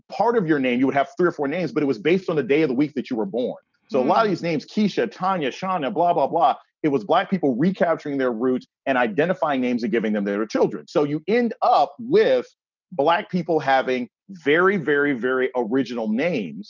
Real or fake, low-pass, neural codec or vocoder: real; 7.2 kHz; none